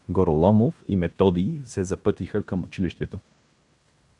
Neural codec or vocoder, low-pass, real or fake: codec, 16 kHz in and 24 kHz out, 0.9 kbps, LongCat-Audio-Codec, fine tuned four codebook decoder; 10.8 kHz; fake